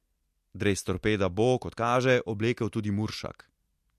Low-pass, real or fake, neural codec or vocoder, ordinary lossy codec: 14.4 kHz; real; none; MP3, 64 kbps